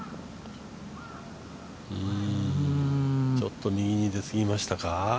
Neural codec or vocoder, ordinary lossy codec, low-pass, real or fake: none; none; none; real